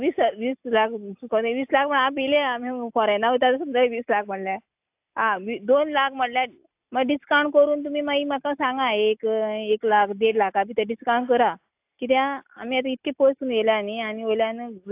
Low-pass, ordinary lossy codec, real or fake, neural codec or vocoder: 3.6 kHz; AAC, 32 kbps; real; none